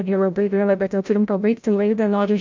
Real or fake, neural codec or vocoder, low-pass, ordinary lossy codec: fake; codec, 16 kHz, 0.5 kbps, FreqCodec, larger model; 7.2 kHz; MP3, 64 kbps